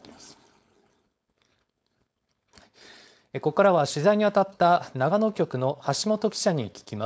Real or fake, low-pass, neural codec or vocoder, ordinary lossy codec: fake; none; codec, 16 kHz, 4.8 kbps, FACodec; none